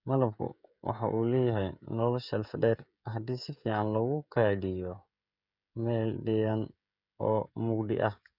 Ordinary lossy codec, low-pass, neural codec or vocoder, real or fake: none; 5.4 kHz; codec, 16 kHz, 16 kbps, FreqCodec, smaller model; fake